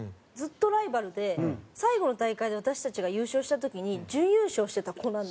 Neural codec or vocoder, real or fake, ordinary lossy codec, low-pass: none; real; none; none